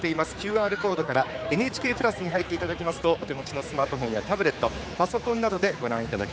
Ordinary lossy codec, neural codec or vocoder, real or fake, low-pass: none; codec, 16 kHz, 4 kbps, X-Codec, HuBERT features, trained on general audio; fake; none